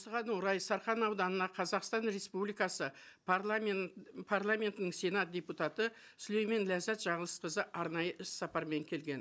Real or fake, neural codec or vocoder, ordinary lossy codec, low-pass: real; none; none; none